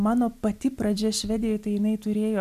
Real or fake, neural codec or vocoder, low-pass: real; none; 14.4 kHz